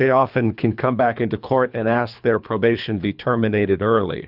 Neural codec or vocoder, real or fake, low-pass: codec, 24 kHz, 3 kbps, HILCodec; fake; 5.4 kHz